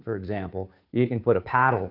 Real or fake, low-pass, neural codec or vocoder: fake; 5.4 kHz; codec, 16 kHz, 2 kbps, FunCodec, trained on Chinese and English, 25 frames a second